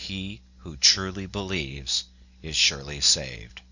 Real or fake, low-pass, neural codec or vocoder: real; 7.2 kHz; none